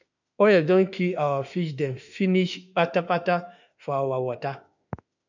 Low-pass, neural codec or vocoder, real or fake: 7.2 kHz; autoencoder, 48 kHz, 32 numbers a frame, DAC-VAE, trained on Japanese speech; fake